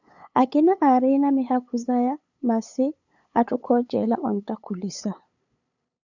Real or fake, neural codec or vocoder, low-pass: fake; codec, 16 kHz, 8 kbps, FunCodec, trained on LibriTTS, 25 frames a second; 7.2 kHz